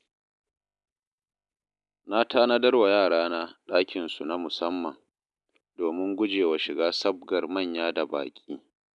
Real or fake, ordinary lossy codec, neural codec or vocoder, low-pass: real; none; none; 10.8 kHz